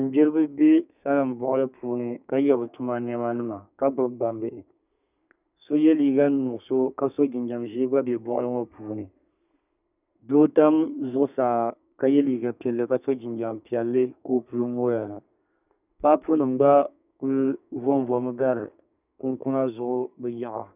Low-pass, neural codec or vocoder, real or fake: 3.6 kHz; codec, 32 kHz, 1.9 kbps, SNAC; fake